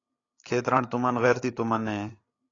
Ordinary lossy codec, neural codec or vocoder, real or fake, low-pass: AAC, 32 kbps; codec, 16 kHz, 8 kbps, FreqCodec, larger model; fake; 7.2 kHz